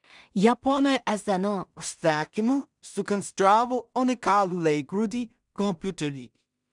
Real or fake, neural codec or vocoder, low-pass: fake; codec, 16 kHz in and 24 kHz out, 0.4 kbps, LongCat-Audio-Codec, two codebook decoder; 10.8 kHz